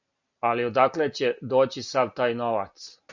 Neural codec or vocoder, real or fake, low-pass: none; real; 7.2 kHz